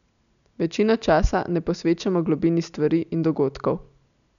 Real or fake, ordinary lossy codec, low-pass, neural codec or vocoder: real; none; 7.2 kHz; none